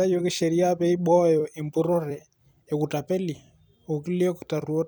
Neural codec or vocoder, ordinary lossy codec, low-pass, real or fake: vocoder, 44.1 kHz, 128 mel bands every 256 samples, BigVGAN v2; none; none; fake